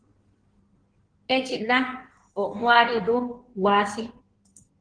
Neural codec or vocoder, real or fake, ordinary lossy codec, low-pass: codec, 16 kHz in and 24 kHz out, 1.1 kbps, FireRedTTS-2 codec; fake; Opus, 16 kbps; 9.9 kHz